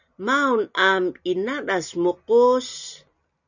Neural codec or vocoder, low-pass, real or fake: none; 7.2 kHz; real